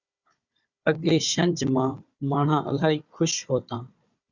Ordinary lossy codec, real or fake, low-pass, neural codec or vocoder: Opus, 64 kbps; fake; 7.2 kHz; codec, 16 kHz, 4 kbps, FunCodec, trained on Chinese and English, 50 frames a second